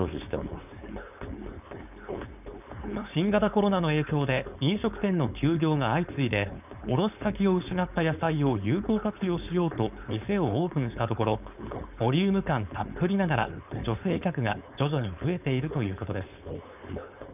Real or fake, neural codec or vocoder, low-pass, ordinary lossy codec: fake; codec, 16 kHz, 4.8 kbps, FACodec; 3.6 kHz; none